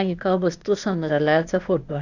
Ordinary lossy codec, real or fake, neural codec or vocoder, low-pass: none; fake; codec, 16 kHz, 0.8 kbps, ZipCodec; 7.2 kHz